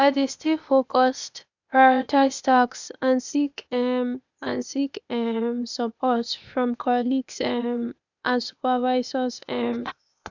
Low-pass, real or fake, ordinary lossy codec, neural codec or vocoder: 7.2 kHz; fake; none; codec, 16 kHz, 0.8 kbps, ZipCodec